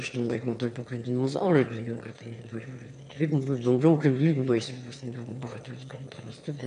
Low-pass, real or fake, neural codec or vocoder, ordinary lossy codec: 9.9 kHz; fake; autoencoder, 22.05 kHz, a latent of 192 numbers a frame, VITS, trained on one speaker; AAC, 64 kbps